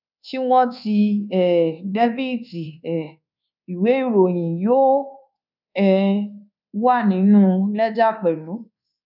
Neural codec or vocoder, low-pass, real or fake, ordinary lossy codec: codec, 24 kHz, 1.2 kbps, DualCodec; 5.4 kHz; fake; none